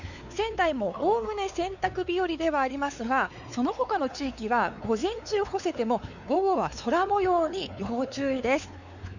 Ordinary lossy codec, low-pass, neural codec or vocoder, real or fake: none; 7.2 kHz; codec, 16 kHz, 4 kbps, X-Codec, WavLM features, trained on Multilingual LibriSpeech; fake